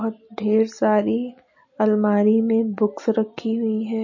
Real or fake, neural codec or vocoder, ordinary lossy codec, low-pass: real; none; MP3, 32 kbps; 7.2 kHz